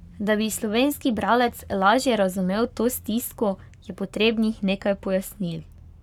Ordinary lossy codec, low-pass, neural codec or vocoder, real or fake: none; 19.8 kHz; codec, 44.1 kHz, 7.8 kbps, Pupu-Codec; fake